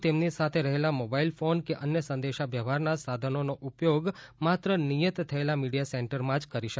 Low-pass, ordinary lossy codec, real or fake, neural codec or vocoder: none; none; real; none